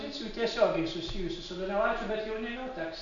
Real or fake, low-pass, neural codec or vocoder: real; 7.2 kHz; none